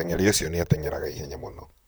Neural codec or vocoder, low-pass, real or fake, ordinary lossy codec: vocoder, 44.1 kHz, 128 mel bands, Pupu-Vocoder; none; fake; none